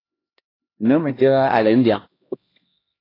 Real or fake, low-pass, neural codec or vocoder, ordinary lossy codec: fake; 5.4 kHz; codec, 16 kHz, 1 kbps, X-Codec, HuBERT features, trained on LibriSpeech; AAC, 24 kbps